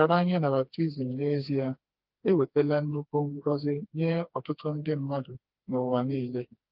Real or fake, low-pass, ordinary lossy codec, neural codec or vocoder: fake; 5.4 kHz; Opus, 24 kbps; codec, 16 kHz, 2 kbps, FreqCodec, smaller model